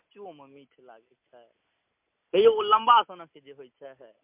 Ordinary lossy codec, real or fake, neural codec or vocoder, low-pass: MP3, 32 kbps; real; none; 3.6 kHz